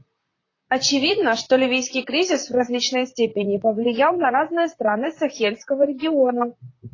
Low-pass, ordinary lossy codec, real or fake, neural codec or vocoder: 7.2 kHz; AAC, 32 kbps; fake; vocoder, 44.1 kHz, 128 mel bands, Pupu-Vocoder